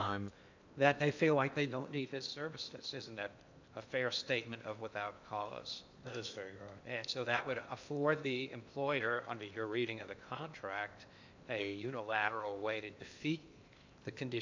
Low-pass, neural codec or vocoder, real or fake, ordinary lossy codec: 7.2 kHz; codec, 16 kHz in and 24 kHz out, 0.8 kbps, FocalCodec, streaming, 65536 codes; fake; MP3, 64 kbps